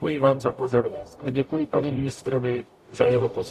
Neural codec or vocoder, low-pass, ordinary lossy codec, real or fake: codec, 44.1 kHz, 0.9 kbps, DAC; 14.4 kHz; AAC, 64 kbps; fake